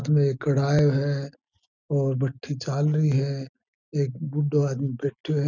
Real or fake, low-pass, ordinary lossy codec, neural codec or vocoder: real; 7.2 kHz; none; none